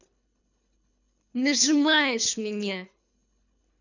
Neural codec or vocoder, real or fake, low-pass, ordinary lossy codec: codec, 24 kHz, 3 kbps, HILCodec; fake; 7.2 kHz; none